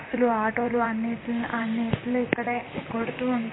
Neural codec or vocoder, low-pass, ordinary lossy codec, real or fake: vocoder, 44.1 kHz, 128 mel bands, Pupu-Vocoder; 7.2 kHz; AAC, 16 kbps; fake